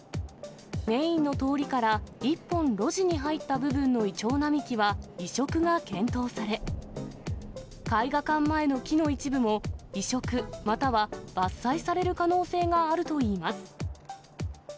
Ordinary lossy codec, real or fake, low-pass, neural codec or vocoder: none; real; none; none